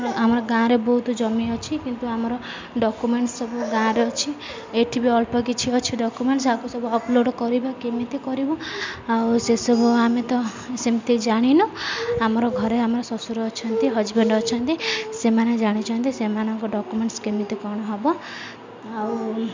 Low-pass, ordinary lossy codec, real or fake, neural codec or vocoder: 7.2 kHz; MP3, 64 kbps; real; none